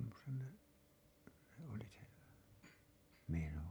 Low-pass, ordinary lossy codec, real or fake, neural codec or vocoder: none; none; real; none